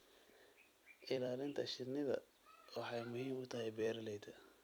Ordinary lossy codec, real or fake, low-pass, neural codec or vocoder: none; fake; none; vocoder, 44.1 kHz, 128 mel bands every 256 samples, BigVGAN v2